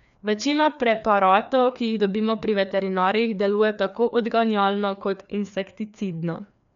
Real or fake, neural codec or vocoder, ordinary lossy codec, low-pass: fake; codec, 16 kHz, 2 kbps, FreqCodec, larger model; none; 7.2 kHz